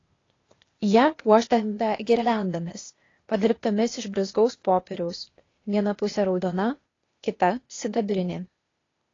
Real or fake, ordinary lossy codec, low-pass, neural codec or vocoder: fake; AAC, 32 kbps; 7.2 kHz; codec, 16 kHz, 0.8 kbps, ZipCodec